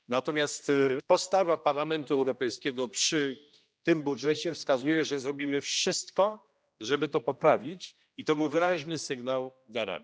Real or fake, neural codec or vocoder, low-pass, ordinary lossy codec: fake; codec, 16 kHz, 1 kbps, X-Codec, HuBERT features, trained on general audio; none; none